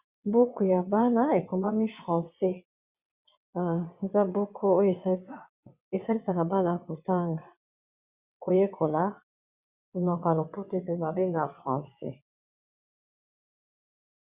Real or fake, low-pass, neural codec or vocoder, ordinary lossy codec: fake; 3.6 kHz; vocoder, 22.05 kHz, 80 mel bands, WaveNeXt; Opus, 64 kbps